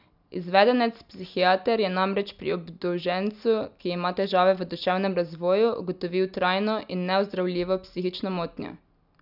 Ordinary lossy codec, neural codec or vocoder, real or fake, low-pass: none; none; real; 5.4 kHz